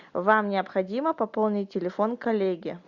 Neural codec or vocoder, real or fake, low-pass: none; real; 7.2 kHz